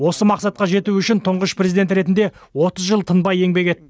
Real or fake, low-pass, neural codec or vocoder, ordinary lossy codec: real; none; none; none